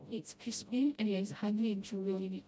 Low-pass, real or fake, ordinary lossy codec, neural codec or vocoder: none; fake; none; codec, 16 kHz, 0.5 kbps, FreqCodec, smaller model